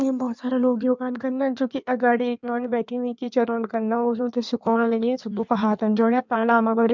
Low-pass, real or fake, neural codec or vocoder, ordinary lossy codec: 7.2 kHz; fake; codec, 16 kHz in and 24 kHz out, 1.1 kbps, FireRedTTS-2 codec; MP3, 64 kbps